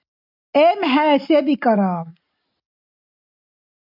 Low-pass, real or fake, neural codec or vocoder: 5.4 kHz; real; none